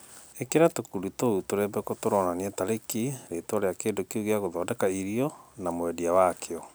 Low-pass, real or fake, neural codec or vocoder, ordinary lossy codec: none; real; none; none